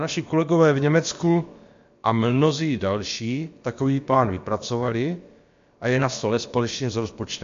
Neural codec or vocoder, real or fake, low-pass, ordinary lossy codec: codec, 16 kHz, about 1 kbps, DyCAST, with the encoder's durations; fake; 7.2 kHz; MP3, 48 kbps